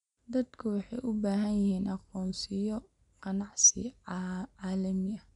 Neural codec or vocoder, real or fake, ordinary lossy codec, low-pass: none; real; none; 10.8 kHz